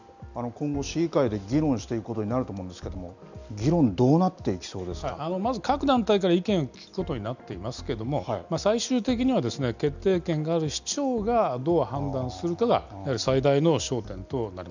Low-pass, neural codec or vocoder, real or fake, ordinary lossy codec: 7.2 kHz; none; real; none